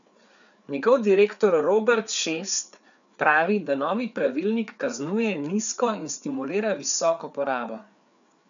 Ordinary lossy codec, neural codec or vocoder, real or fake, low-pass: none; codec, 16 kHz, 4 kbps, FreqCodec, larger model; fake; 7.2 kHz